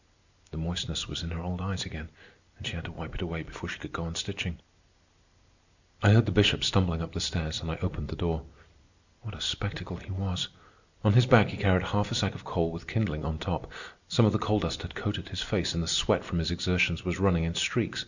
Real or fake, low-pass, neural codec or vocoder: real; 7.2 kHz; none